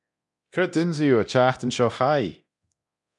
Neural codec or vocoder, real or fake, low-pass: codec, 24 kHz, 0.9 kbps, DualCodec; fake; 10.8 kHz